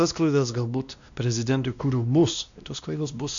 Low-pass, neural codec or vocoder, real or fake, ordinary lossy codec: 7.2 kHz; codec, 16 kHz, 1 kbps, X-Codec, WavLM features, trained on Multilingual LibriSpeech; fake; MP3, 96 kbps